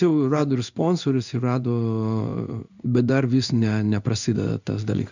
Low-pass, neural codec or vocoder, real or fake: 7.2 kHz; codec, 16 kHz in and 24 kHz out, 1 kbps, XY-Tokenizer; fake